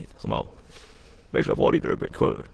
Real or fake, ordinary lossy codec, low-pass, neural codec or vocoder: fake; Opus, 16 kbps; 9.9 kHz; autoencoder, 22.05 kHz, a latent of 192 numbers a frame, VITS, trained on many speakers